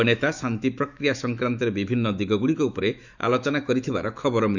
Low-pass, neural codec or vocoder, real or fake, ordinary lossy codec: 7.2 kHz; autoencoder, 48 kHz, 128 numbers a frame, DAC-VAE, trained on Japanese speech; fake; none